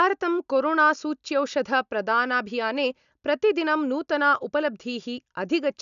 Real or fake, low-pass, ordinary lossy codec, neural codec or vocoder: real; 7.2 kHz; none; none